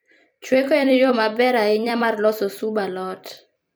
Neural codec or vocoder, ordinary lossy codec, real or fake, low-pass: vocoder, 44.1 kHz, 128 mel bands every 256 samples, BigVGAN v2; none; fake; none